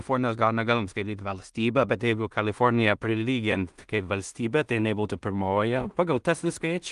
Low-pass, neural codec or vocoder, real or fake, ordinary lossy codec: 10.8 kHz; codec, 16 kHz in and 24 kHz out, 0.4 kbps, LongCat-Audio-Codec, two codebook decoder; fake; Opus, 32 kbps